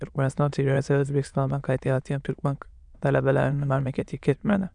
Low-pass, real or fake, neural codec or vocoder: 9.9 kHz; fake; autoencoder, 22.05 kHz, a latent of 192 numbers a frame, VITS, trained on many speakers